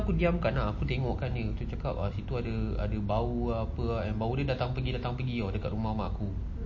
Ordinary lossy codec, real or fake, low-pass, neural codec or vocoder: MP3, 32 kbps; real; 7.2 kHz; none